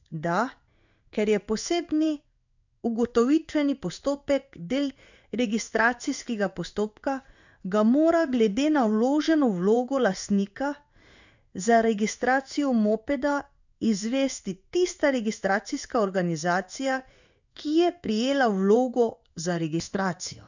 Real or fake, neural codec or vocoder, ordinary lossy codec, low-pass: fake; codec, 16 kHz in and 24 kHz out, 1 kbps, XY-Tokenizer; none; 7.2 kHz